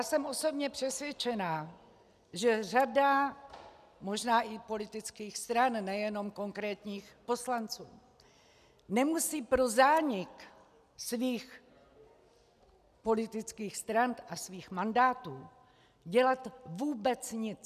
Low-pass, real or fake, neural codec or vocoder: 14.4 kHz; real; none